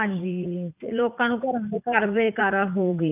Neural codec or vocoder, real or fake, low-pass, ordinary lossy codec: vocoder, 44.1 kHz, 80 mel bands, Vocos; fake; 3.6 kHz; none